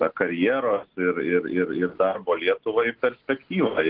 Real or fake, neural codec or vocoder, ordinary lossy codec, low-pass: real; none; Opus, 16 kbps; 5.4 kHz